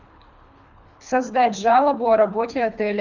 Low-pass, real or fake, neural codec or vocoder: 7.2 kHz; fake; codec, 24 kHz, 3 kbps, HILCodec